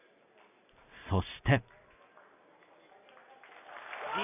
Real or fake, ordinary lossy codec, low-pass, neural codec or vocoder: real; none; 3.6 kHz; none